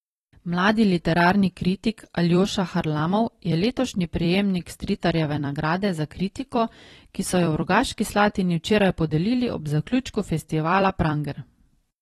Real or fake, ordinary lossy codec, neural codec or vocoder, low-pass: fake; AAC, 32 kbps; vocoder, 44.1 kHz, 128 mel bands every 256 samples, BigVGAN v2; 19.8 kHz